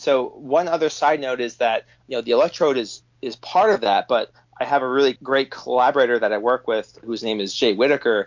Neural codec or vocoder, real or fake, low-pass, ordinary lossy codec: none; real; 7.2 kHz; MP3, 48 kbps